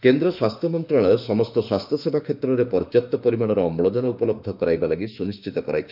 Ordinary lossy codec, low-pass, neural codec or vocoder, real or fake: none; 5.4 kHz; autoencoder, 48 kHz, 32 numbers a frame, DAC-VAE, trained on Japanese speech; fake